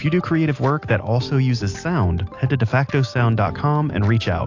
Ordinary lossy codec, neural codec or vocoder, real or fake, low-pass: AAC, 48 kbps; none; real; 7.2 kHz